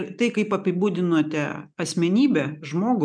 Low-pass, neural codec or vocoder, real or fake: 9.9 kHz; none; real